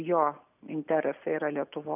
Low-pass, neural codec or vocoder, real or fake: 3.6 kHz; none; real